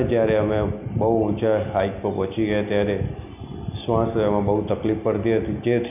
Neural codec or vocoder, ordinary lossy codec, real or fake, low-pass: none; none; real; 3.6 kHz